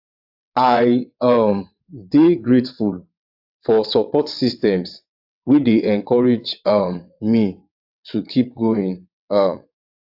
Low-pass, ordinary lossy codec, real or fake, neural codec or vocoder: 5.4 kHz; AAC, 48 kbps; fake; vocoder, 22.05 kHz, 80 mel bands, WaveNeXt